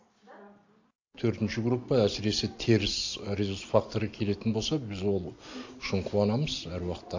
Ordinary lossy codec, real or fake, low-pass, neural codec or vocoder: AAC, 48 kbps; real; 7.2 kHz; none